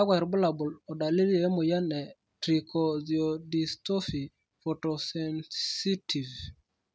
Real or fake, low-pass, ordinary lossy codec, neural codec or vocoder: real; none; none; none